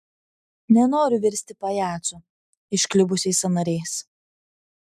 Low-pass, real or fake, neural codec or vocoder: 14.4 kHz; real; none